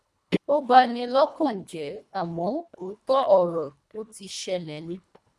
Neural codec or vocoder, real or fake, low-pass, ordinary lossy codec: codec, 24 kHz, 1.5 kbps, HILCodec; fake; none; none